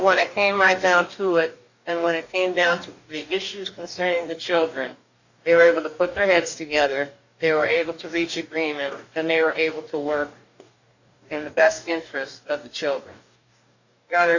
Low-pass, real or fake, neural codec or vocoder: 7.2 kHz; fake; codec, 44.1 kHz, 2.6 kbps, DAC